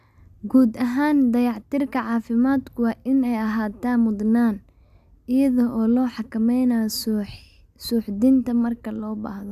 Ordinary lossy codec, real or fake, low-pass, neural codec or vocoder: AAC, 96 kbps; real; 14.4 kHz; none